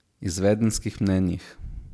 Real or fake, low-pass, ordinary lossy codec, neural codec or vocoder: real; none; none; none